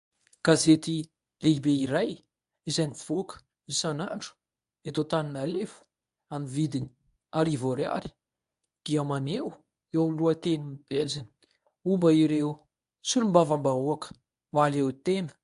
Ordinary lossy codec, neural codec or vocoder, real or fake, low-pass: AAC, 96 kbps; codec, 24 kHz, 0.9 kbps, WavTokenizer, medium speech release version 1; fake; 10.8 kHz